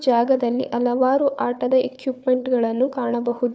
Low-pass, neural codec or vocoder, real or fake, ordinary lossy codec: none; codec, 16 kHz, 4 kbps, FunCodec, trained on Chinese and English, 50 frames a second; fake; none